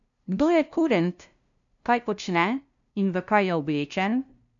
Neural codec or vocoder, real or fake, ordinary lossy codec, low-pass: codec, 16 kHz, 0.5 kbps, FunCodec, trained on LibriTTS, 25 frames a second; fake; none; 7.2 kHz